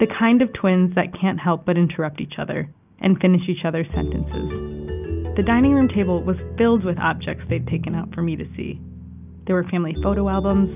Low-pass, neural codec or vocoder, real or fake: 3.6 kHz; none; real